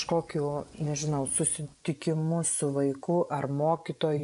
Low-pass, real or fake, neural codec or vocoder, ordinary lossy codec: 10.8 kHz; fake; vocoder, 24 kHz, 100 mel bands, Vocos; MP3, 96 kbps